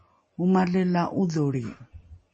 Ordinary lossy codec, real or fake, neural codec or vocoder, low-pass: MP3, 32 kbps; real; none; 10.8 kHz